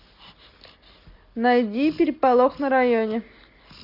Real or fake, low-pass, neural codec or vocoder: real; 5.4 kHz; none